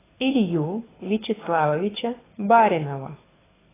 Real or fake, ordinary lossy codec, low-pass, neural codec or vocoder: fake; AAC, 16 kbps; 3.6 kHz; codec, 24 kHz, 6 kbps, HILCodec